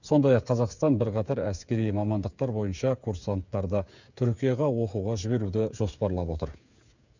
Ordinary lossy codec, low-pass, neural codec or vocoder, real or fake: none; 7.2 kHz; codec, 16 kHz, 8 kbps, FreqCodec, smaller model; fake